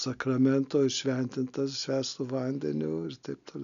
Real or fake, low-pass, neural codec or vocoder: real; 7.2 kHz; none